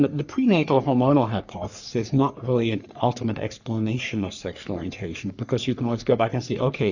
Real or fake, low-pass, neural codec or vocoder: fake; 7.2 kHz; codec, 44.1 kHz, 3.4 kbps, Pupu-Codec